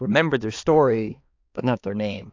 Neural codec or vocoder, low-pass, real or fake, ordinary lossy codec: codec, 16 kHz, 2 kbps, X-Codec, HuBERT features, trained on balanced general audio; 7.2 kHz; fake; AAC, 48 kbps